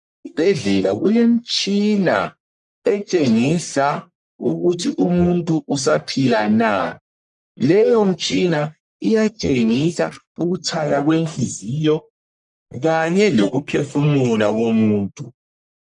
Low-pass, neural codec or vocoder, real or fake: 10.8 kHz; codec, 44.1 kHz, 1.7 kbps, Pupu-Codec; fake